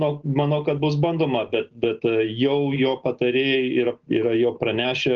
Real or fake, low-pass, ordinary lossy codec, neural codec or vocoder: real; 7.2 kHz; Opus, 16 kbps; none